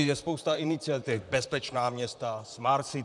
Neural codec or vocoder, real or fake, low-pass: vocoder, 44.1 kHz, 128 mel bands, Pupu-Vocoder; fake; 10.8 kHz